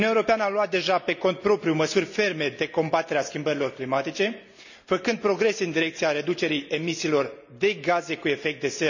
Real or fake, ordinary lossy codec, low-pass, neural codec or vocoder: real; none; 7.2 kHz; none